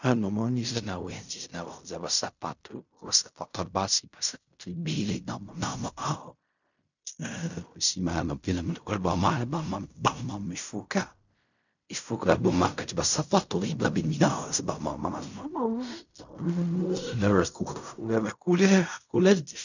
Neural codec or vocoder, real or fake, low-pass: codec, 16 kHz in and 24 kHz out, 0.4 kbps, LongCat-Audio-Codec, fine tuned four codebook decoder; fake; 7.2 kHz